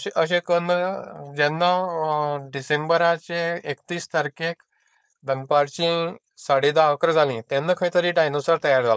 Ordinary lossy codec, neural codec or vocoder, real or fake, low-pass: none; codec, 16 kHz, 4.8 kbps, FACodec; fake; none